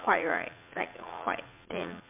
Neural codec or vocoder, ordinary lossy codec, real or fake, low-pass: vocoder, 44.1 kHz, 80 mel bands, Vocos; MP3, 32 kbps; fake; 3.6 kHz